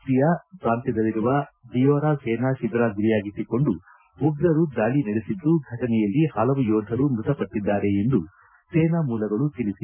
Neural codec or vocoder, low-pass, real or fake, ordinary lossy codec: none; 3.6 kHz; real; none